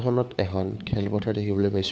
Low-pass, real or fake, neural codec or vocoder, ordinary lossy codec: none; fake; codec, 16 kHz, 4 kbps, FunCodec, trained on LibriTTS, 50 frames a second; none